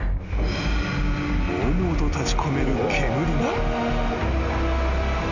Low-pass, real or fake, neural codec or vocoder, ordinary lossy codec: 7.2 kHz; real; none; none